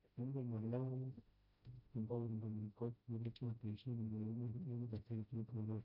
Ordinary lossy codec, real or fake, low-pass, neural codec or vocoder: none; fake; 5.4 kHz; codec, 16 kHz, 0.5 kbps, FreqCodec, smaller model